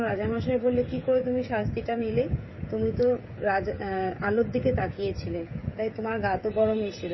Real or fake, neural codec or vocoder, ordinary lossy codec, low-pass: fake; codec, 16 kHz, 16 kbps, FreqCodec, smaller model; MP3, 24 kbps; 7.2 kHz